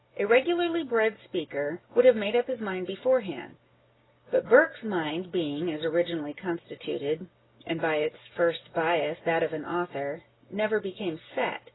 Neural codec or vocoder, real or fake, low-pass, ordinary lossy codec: none; real; 7.2 kHz; AAC, 16 kbps